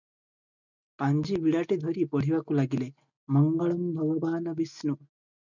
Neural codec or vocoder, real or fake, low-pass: none; real; 7.2 kHz